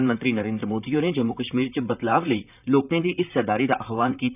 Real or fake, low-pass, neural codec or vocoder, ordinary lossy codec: fake; 3.6 kHz; vocoder, 44.1 kHz, 128 mel bands, Pupu-Vocoder; none